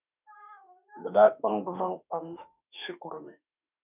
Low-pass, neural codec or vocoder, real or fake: 3.6 kHz; autoencoder, 48 kHz, 32 numbers a frame, DAC-VAE, trained on Japanese speech; fake